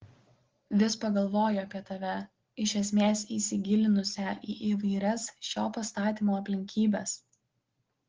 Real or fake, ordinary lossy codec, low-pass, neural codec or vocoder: real; Opus, 16 kbps; 7.2 kHz; none